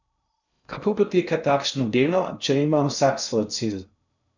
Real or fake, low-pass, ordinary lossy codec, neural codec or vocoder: fake; 7.2 kHz; none; codec, 16 kHz in and 24 kHz out, 0.6 kbps, FocalCodec, streaming, 2048 codes